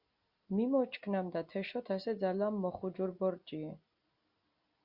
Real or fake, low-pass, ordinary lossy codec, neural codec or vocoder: real; 5.4 kHz; Opus, 64 kbps; none